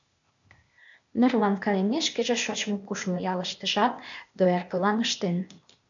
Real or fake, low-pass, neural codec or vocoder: fake; 7.2 kHz; codec, 16 kHz, 0.8 kbps, ZipCodec